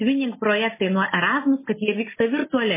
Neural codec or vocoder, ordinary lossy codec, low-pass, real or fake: none; MP3, 16 kbps; 3.6 kHz; real